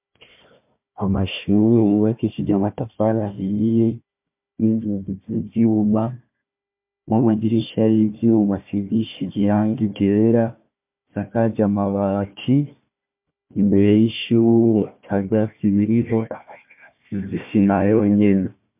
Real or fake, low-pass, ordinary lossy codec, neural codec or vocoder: fake; 3.6 kHz; MP3, 32 kbps; codec, 16 kHz, 1 kbps, FunCodec, trained on Chinese and English, 50 frames a second